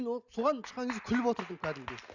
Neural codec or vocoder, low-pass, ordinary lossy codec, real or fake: none; 7.2 kHz; Opus, 64 kbps; real